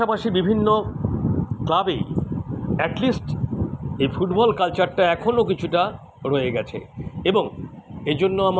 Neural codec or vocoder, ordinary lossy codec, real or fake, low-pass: none; none; real; none